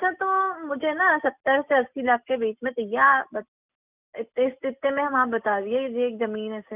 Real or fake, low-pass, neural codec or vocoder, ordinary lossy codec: real; 3.6 kHz; none; none